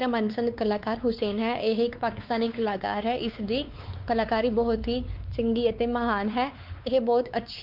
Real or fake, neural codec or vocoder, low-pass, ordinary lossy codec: fake; codec, 16 kHz, 4 kbps, X-Codec, WavLM features, trained on Multilingual LibriSpeech; 5.4 kHz; Opus, 24 kbps